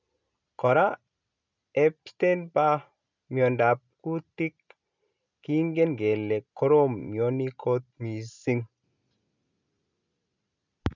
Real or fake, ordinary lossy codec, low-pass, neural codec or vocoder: real; none; 7.2 kHz; none